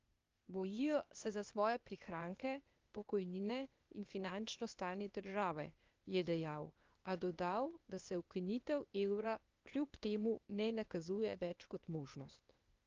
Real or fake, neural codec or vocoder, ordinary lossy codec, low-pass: fake; codec, 16 kHz, 0.8 kbps, ZipCodec; Opus, 24 kbps; 7.2 kHz